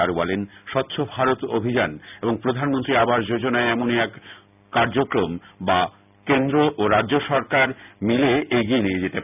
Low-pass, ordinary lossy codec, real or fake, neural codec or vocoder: 3.6 kHz; none; real; none